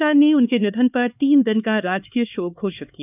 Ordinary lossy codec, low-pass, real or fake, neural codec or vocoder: none; 3.6 kHz; fake; codec, 16 kHz, 4 kbps, X-Codec, HuBERT features, trained on LibriSpeech